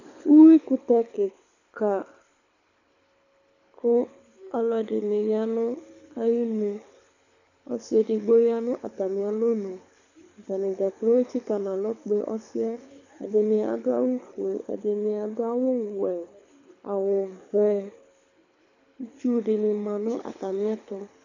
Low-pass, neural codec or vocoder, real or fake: 7.2 kHz; codec, 24 kHz, 6 kbps, HILCodec; fake